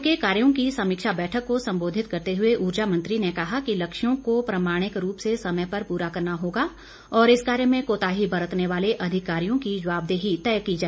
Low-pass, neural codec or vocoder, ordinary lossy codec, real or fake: none; none; none; real